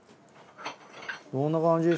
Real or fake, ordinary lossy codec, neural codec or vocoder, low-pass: real; none; none; none